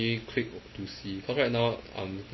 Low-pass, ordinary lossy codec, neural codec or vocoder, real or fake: 7.2 kHz; MP3, 24 kbps; none; real